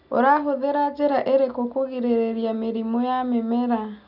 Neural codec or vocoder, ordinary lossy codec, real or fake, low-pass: none; none; real; 5.4 kHz